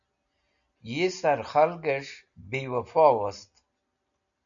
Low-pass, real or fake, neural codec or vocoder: 7.2 kHz; real; none